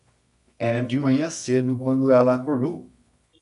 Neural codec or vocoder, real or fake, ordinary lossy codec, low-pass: codec, 24 kHz, 0.9 kbps, WavTokenizer, medium music audio release; fake; AAC, 96 kbps; 10.8 kHz